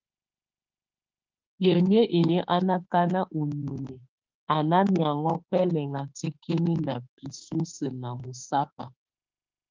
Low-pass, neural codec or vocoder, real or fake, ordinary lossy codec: 7.2 kHz; autoencoder, 48 kHz, 32 numbers a frame, DAC-VAE, trained on Japanese speech; fake; Opus, 32 kbps